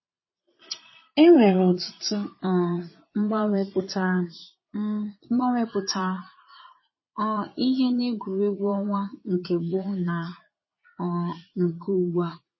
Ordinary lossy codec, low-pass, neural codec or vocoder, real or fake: MP3, 24 kbps; 7.2 kHz; vocoder, 22.05 kHz, 80 mel bands, Vocos; fake